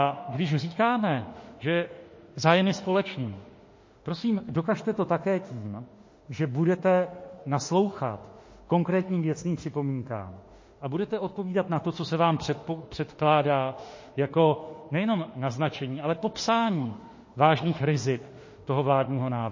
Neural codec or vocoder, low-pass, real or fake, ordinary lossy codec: autoencoder, 48 kHz, 32 numbers a frame, DAC-VAE, trained on Japanese speech; 7.2 kHz; fake; MP3, 32 kbps